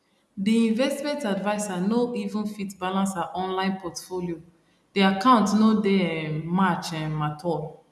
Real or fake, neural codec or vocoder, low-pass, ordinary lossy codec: real; none; none; none